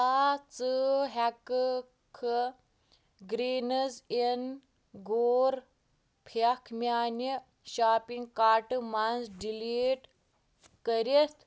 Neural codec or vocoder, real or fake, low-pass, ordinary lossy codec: none; real; none; none